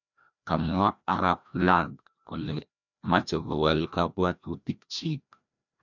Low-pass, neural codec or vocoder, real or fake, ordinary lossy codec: 7.2 kHz; codec, 16 kHz, 1 kbps, FreqCodec, larger model; fake; none